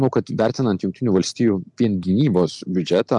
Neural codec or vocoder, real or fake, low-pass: none; real; 10.8 kHz